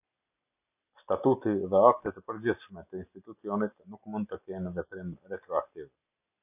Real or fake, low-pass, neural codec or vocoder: real; 3.6 kHz; none